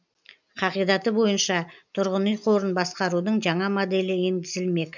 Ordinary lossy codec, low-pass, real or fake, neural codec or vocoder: none; 7.2 kHz; real; none